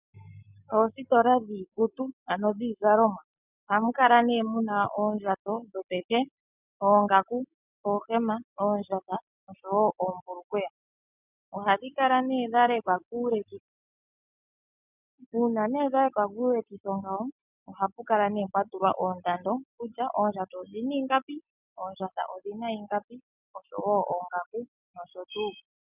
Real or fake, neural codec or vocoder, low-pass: real; none; 3.6 kHz